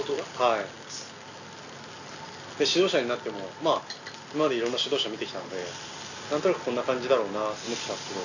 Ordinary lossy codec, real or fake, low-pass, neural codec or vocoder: none; real; 7.2 kHz; none